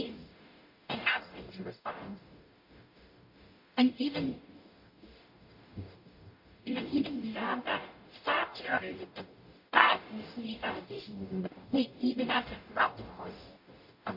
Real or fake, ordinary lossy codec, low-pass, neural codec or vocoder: fake; MP3, 32 kbps; 5.4 kHz; codec, 44.1 kHz, 0.9 kbps, DAC